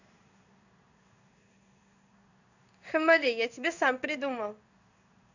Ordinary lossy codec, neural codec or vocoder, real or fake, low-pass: none; codec, 16 kHz in and 24 kHz out, 1 kbps, XY-Tokenizer; fake; 7.2 kHz